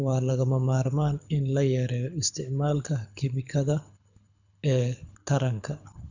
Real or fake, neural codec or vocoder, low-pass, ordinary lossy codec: fake; codec, 24 kHz, 6 kbps, HILCodec; 7.2 kHz; none